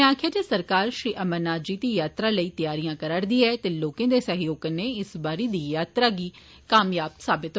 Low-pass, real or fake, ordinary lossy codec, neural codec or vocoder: none; real; none; none